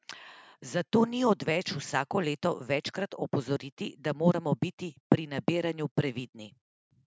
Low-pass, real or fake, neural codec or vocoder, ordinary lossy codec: none; real; none; none